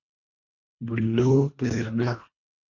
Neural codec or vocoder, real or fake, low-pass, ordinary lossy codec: codec, 24 kHz, 1.5 kbps, HILCodec; fake; 7.2 kHz; MP3, 64 kbps